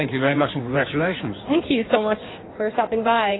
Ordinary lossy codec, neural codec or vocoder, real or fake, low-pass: AAC, 16 kbps; codec, 16 kHz in and 24 kHz out, 1.1 kbps, FireRedTTS-2 codec; fake; 7.2 kHz